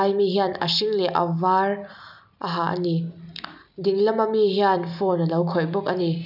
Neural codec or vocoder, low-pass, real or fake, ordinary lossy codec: none; 5.4 kHz; real; none